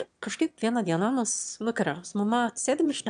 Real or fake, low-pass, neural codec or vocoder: fake; 9.9 kHz; autoencoder, 22.05 kHz, a latent of 192 numbers a frame, VITS, trained on one speaker